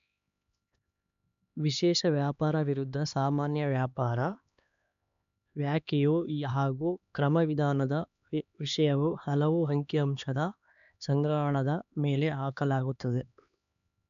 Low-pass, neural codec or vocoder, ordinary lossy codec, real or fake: 7.2 kHz; codec, 16 kHz, 2 kbps, X-Codec, HuBERT features, trained on LibriSpeech; none; fake